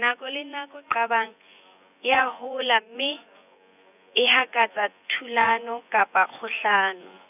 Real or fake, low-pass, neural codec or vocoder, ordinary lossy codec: fake; 3.6 kHz; vocoder, 24 kHz, 100 mel bands, Vocos; none